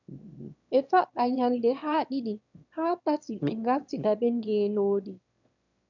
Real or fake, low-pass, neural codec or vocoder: fake; 7.2 kHz; autoencoder, 22.05 kHz, a latent of 192 numbers a frame, VITS, trained on one speaker